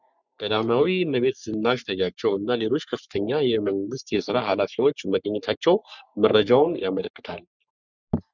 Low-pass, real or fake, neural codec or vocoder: 7.2 kHz; fake; codec, 44.1 kHz, 3.4 kbps, Pupu-Codec